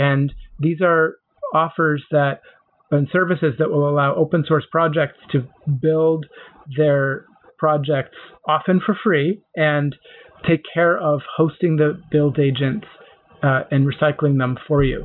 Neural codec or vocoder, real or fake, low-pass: none; real; 5.4 kHz